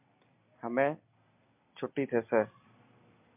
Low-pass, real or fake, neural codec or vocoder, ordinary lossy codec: 3.6 kHz; real; none; MP3, 32 kbps